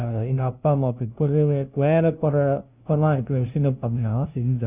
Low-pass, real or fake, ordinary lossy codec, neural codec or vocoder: 3.6 kHz; fake; Opus, 64 kbps; codec, 16 kHz, 0.5 kbps, FunCodec, trained on LibriTTS, 25 frames a second